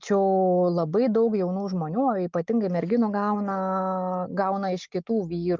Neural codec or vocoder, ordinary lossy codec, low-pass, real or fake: none; Opus, 32 kbps; 7.2 kHz; real